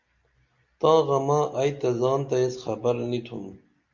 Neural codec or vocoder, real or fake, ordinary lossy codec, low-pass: none; real; Opus, 64 kbps; 7.2 kHz